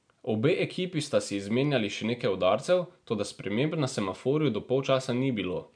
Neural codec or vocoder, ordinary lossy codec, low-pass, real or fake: none; none; 9.9 kHz; real